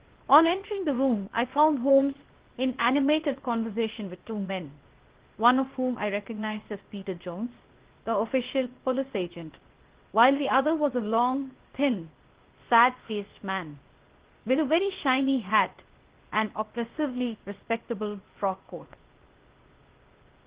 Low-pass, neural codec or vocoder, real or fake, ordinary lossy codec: 3.6 kHz; codec, 16 kHz, 0.8 kbps, ZipCodec; fake; Opus, 16 kbps